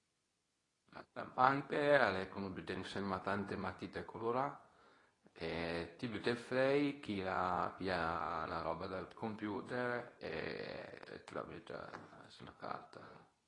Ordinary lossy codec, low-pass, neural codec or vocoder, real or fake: AAC, 32 kbps; 10.8 kHz; codec, 24 kHz, 0.9 kbps, WavTokenizer, medium speech release version 2; fake